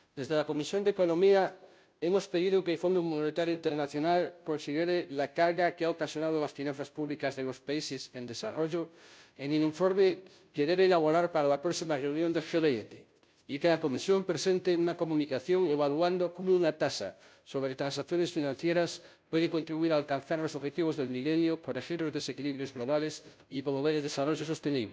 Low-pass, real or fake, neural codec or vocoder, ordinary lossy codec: none; fake; codec, 16 kHz, 0.5 kbps, FunCodec, trained on Chinese and English, 25 frames a second; none